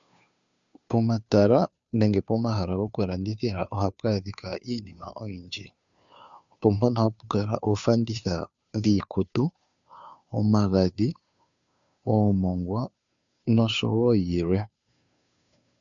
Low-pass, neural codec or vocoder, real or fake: 7.2 kHz; codec, 16 kHz, 2 kbps, FunCodec, trained on Chinese and English, 25 frames a second; fake